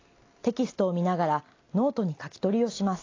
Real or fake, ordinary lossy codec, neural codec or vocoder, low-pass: real; AAC, 32 kbps; none; 7.2 kHz